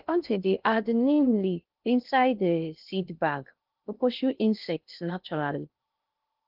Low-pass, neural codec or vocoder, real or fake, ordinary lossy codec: 5.4 kHz; codec, 16 kHz in and 24 kHz out, 0.6 kbps, FocalCodec, streaming, 2048 codes; fake; Opus, 24 kbps